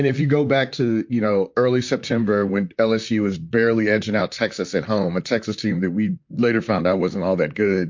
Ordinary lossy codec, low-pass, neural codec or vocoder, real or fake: MP3, 48 kbps; 7.2 kHz; vocoder, 44.1 kHz, 128 mel bands, Pupu-Vocoder; fake